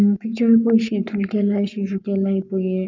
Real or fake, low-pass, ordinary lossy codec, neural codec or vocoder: fake; 7.2 kHz; none; codec, 44.1 kHz, 7.8 kbps, Pupu-Codec